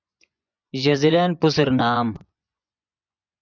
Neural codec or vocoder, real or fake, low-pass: vocoder, 24 kHz, 100 mel bands, Vocos; fake; 7.2 kHz